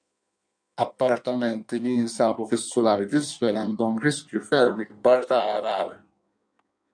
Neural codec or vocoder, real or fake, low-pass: codec, 16 kHz in and 24 kHz out, 1.1 kbps, FireRedTTS-2 codec; fake; 9.9 kHz